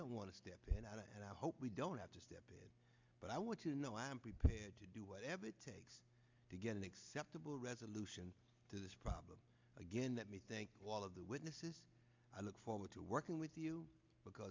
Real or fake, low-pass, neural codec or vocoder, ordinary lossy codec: real; 7.2 kHz; none; MP3, 64 kbps